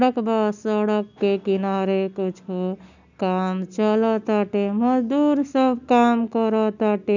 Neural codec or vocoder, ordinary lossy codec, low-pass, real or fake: none; none; 7.2 kHz; real